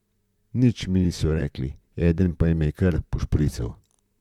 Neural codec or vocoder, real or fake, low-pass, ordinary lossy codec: vocoder, 44.1 kHz, 128 mel bands, Pupu-Vocoder; fake; 19.8 kHz; none